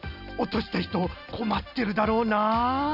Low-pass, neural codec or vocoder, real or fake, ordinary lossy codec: 5.4 kHz; none; real; none